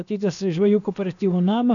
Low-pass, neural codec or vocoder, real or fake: 7.2 kHz; codec, 16 kHz, about 1 kbps, DyCAST, with the encoder's durations; fake